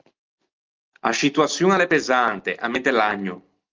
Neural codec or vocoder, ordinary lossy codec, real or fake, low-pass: none; Opus, 24 kbps; real; 7.2 kHz